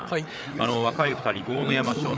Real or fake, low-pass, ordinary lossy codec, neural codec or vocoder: fake; none; none; codec, 16 kHz, 8 kbps, FreqCodec, larger model